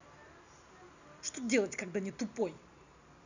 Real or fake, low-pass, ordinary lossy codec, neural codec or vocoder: real; 7.2 kHz; none; none